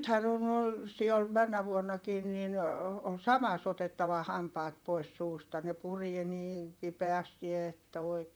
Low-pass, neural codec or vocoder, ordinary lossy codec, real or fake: none; vocoder, 44.1 kHz, 128 mel bands, Pupu-Vocoder; none; fake